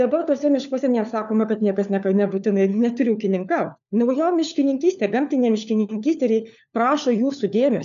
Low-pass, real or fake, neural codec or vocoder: 7.2 kHz; fake; codec, 16 kHz, 4 kbps, FunCodec, trained on LibriTTS, 50 frames a second